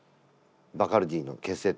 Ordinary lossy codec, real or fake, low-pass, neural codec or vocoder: none; real; none; none